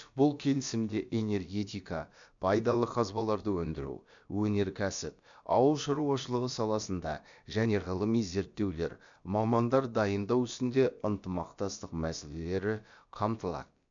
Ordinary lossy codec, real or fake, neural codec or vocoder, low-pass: MP3, 64 kbps; fake; codec, 16 kHz, about 1 kbps, DyCAST, with the encoder's durations; 7.2 kHz